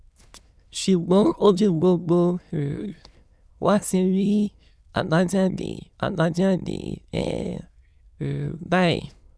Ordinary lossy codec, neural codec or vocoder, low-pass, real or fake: none; autoencoder, 22.05 kHz, a latent of 192 numbers a frame, VITS, trained on many speakers; none; fake